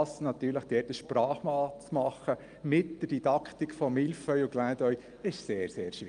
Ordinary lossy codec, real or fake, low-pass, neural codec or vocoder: Opus, 32 kbps; real; 9.9 kHz; none